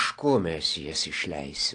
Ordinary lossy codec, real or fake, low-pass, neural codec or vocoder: AAC, 48 kbps; real; 9.9 kHz; none